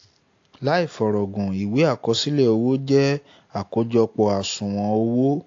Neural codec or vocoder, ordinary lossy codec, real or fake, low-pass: none; AAC, 48 kbps; real; 7.2 kHz